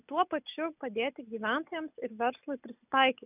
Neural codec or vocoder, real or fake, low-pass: none; real; 3.6 kHz